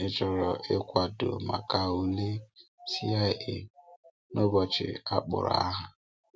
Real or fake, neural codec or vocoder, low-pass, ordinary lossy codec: real; none; none; none